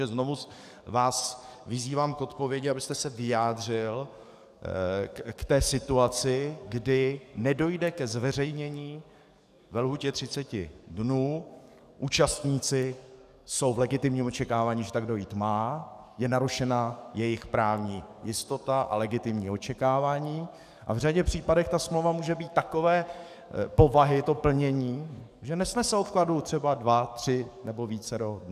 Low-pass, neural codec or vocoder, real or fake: 14.4 kHz; codec, 44.1 kHz, 7.8 kbps, DAC; fake